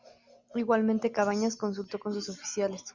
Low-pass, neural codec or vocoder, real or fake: 7.2 kHz; none; real